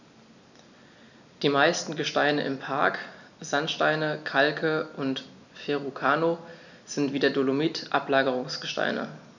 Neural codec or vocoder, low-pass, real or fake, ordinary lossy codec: none; 7.2 kHz; real; none